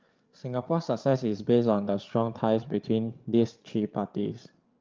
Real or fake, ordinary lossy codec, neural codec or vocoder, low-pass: fake; Opus, 24 kbps; codec, 16 kHz, 8 kbps, FreqCodec, larger model; 7.2 kHz